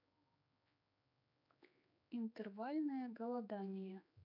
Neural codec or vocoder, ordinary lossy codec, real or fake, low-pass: codec, 16 kHz, 4 kbps, X-Codec, HuBERT features, trained on general audio; MP3, 48 kbps; fake; 5.4 kHz